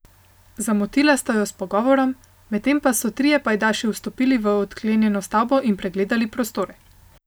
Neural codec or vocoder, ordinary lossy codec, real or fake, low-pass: none; none; real; none